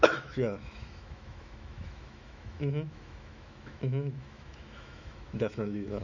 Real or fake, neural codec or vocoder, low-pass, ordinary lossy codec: fake; autoencoder, 48 kHz, 128 numbers a frame, DAC-VAE, trained on Japanese speech; 7.2 kHz; none